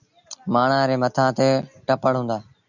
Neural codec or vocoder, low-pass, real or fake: none; 7.2 kHz; real